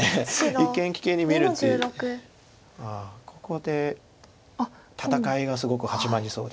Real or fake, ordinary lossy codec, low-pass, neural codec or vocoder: real; none; none; none